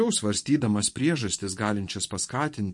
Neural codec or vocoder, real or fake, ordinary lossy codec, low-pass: vocoder, 24 kHz, 100 mel bands, Vocos; fake; MP3, 48 kbps; 10.8 kHz